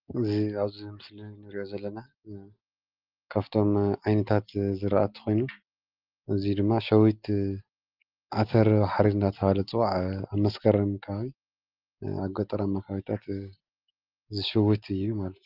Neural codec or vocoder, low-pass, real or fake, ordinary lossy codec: none; 5.4 kHz; real; Opus, 32 kbps